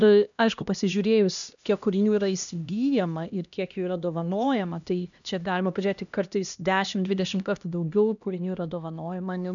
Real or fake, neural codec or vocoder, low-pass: fake; codec, 16 kHz, 1 kbps, X-Codec, HuBERT features, trained on LibriSpeech; 7.2 kHz